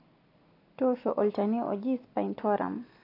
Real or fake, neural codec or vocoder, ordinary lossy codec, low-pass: real; none; AAC, 32 kbps; 5.4 kHz